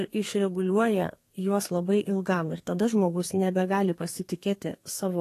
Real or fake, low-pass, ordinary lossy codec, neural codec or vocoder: fake; 14.4 kHz; AAC, 48 kbps; codec, 32 kHz, 1.9 kbps, SNAC